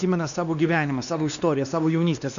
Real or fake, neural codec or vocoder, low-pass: fake; codec, 16 kHz, 2 kbps, X-Codec, WavLM features, trained on Multilingual LibriSpeech; 7.2 kHz